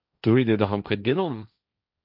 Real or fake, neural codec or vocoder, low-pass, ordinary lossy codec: fake; codec, 16 kHz, 1.1 kbps, Voila-Tokenizer; 5.4 kHz; MP3, 48 kbps